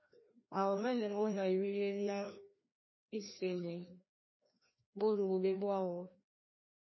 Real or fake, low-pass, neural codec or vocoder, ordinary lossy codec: fake; 7.2 kHz; codec, 16 kHz, 1 kbps, FreqCodec, larger model; MP3, 24 kbps